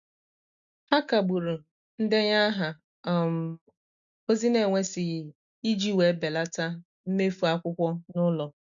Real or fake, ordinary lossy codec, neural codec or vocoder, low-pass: real; none; none; 7.2 kHz